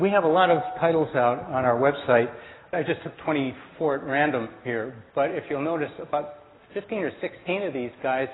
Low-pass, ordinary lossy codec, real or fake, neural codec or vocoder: 7.2 kHz; AAC, 16 kbps; real; none